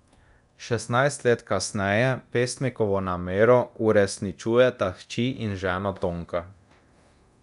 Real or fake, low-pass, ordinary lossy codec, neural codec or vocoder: fake; 10.8 kHz; none; codec, 24 kHz, 0.9 kbps, DualCodec